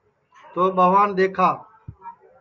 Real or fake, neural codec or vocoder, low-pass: real; none; 7.2 kHz